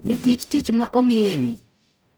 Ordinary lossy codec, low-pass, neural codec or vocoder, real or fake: none; none; codec, 44.1 kHz, 0.9 kbps, DAC; fake